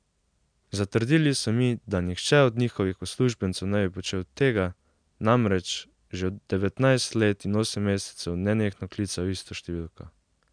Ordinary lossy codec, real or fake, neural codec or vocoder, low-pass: MP3, 96 kbps; real; none; 9.9 kHz